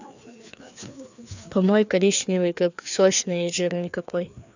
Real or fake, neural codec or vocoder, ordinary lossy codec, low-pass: fake; codec, 16 kHz, 2 kbps, FreqCodec, larger model; none; 7.2 kHz